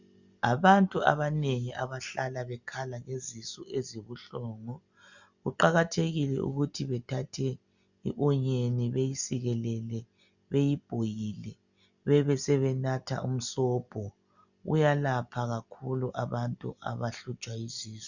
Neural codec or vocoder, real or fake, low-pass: none; real; 7.2 kHz